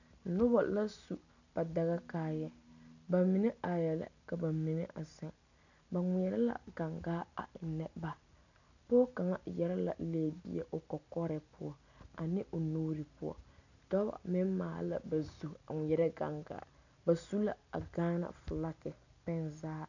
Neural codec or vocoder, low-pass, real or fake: none; 7.2 kHz; real